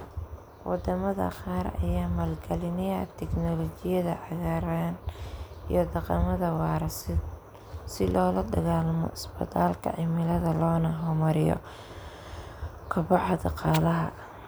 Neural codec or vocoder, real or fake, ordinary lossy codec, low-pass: none; real; none; none